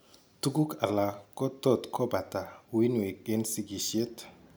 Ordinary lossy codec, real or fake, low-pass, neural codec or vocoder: none; real; none; none